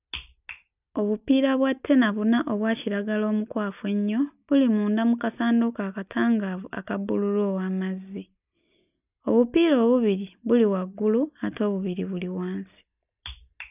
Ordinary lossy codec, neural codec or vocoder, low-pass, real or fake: none; none; 3.6 kHz; real